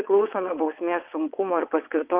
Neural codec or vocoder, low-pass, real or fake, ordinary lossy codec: vocoder, 22.05 kHz, 80 mel bands, WaveNeXt; 3.6 kHz; fake; Opus, 32 kbps